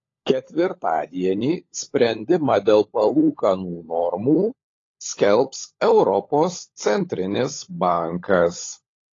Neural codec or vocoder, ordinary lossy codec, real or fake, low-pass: codec, 16 kHz, 16 kbps, FunCodec, trained on LibriTTS, 50 frames a second; AAC, 32 kbps; fake; 7.2 kHz